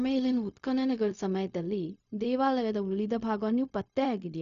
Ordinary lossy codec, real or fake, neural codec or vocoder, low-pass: none; fake; codec, 16 kHz, 0.4 kbps, LongCat-Audio-Codec; 7.2 kHz